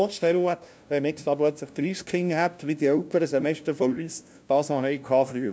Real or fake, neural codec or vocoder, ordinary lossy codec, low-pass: fake; codec, 16 kHz, 0.5 kbps, FunCodec, trained on LibriTTS, 25 frames a second; none; none